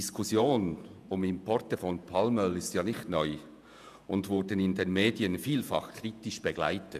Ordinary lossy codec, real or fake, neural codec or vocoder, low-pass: none; fake; vocoder, 48 kHz, 128 mel bands, Vocos; 14.4 kHz